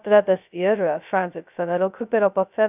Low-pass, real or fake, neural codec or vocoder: 3.6 kHz; fake; codec, 16 kHz, 0.2 kbps, FocalCodec